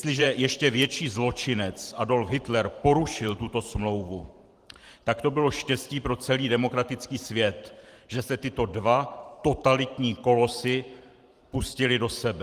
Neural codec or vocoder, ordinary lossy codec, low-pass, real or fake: vocoder, 44.1 kHz, 128 mel bands every 512 samples, BigVGAN v2; Opus, 24 kbps; 14.4 kHz; fake